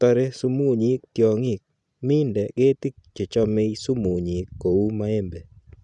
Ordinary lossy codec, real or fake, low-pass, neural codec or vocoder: none; real; 10.8 kHz; none